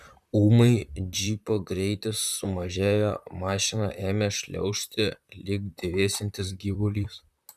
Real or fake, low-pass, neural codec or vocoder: fake; 14.4 kHz; vocoder, 44.1 kHz, 128 mel bands, Pupu-Vocoder